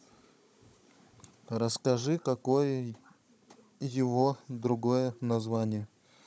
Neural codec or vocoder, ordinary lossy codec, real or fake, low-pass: codec, 16 kHz, 16 kbps, FunCodec, trained on Chinese and English, 50 frames a second; none; fake; none